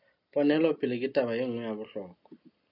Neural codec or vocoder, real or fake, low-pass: none; real; 5.4 kHz